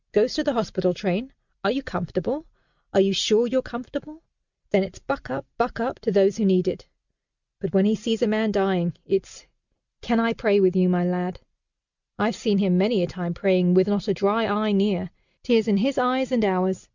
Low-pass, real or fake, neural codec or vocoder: 7.2 kHz; real; none